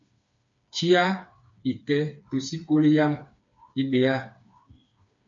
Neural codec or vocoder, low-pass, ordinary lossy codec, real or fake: codec, 16 kHz, 4 kbps, FreqCodec, smaller model; 7.2 kHz; MP3, 48 kbps; fake